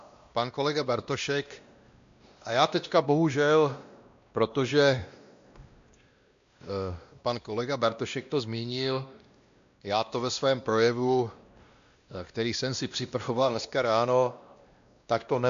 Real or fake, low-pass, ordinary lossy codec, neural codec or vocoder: fake; 7.2 kHz; MP3, 64 kbps; codec, 16 kHz, 1 kbps, X-Codec, WavLM features, trained on Multilingual LibriSpeech